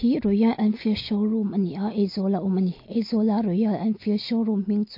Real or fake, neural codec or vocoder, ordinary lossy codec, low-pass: real; none; MP3, 32 kbps; 5.4 kHz